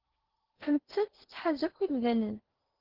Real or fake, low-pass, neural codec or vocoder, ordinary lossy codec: fake; 5.4 kHz; codec, 16 kHz in and 24 kHz out, 0.8 kbps, FocalCodec, streaming, 65536 codes; Opus, 16 kbps